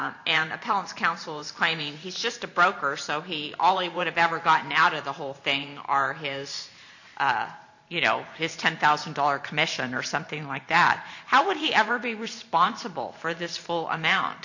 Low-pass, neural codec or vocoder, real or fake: 7.2 kHz; vocoder, 44.1 kHz, 128 mel bands every 512 samples, BigVGAN v2; fake